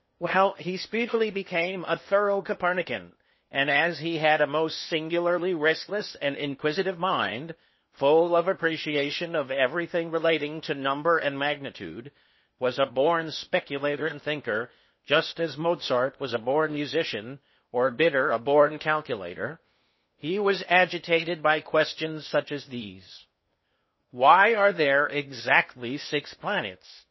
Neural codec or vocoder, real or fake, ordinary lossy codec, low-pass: codec, 16 kHz in and 24 kHz out, 0.8 kbps, FocalCodec, streaming, 65536 codes; fake; MP3, 24 kbps; 7.2 kHz